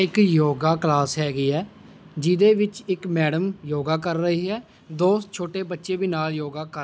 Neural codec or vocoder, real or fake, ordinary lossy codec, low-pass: none; real; none; none